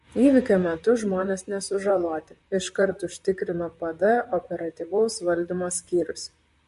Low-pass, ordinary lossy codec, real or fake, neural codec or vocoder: 14.4 kHz; MP3, 48 kbps; fake; vocoder, 44.1 kHz, 128 mel bands, Pupu-Vocoder